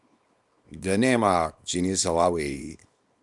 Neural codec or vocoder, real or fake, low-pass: codec, 24 kHz, 0.9 kbps, WavTokenizer, small release; fake; 10.8 kHz